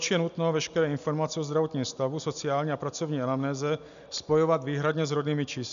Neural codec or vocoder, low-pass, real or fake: none; 7.2 kHz; real